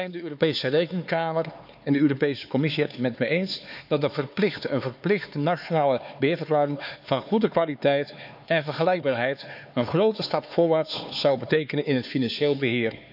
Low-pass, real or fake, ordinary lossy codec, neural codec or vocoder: 5.4 kHz; fake; none; codec, 16 kHz, 4 kbps, X-Codec, HuBERT features, trained on LibriSpeech